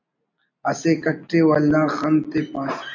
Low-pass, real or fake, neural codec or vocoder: 7.2 kHz; real; none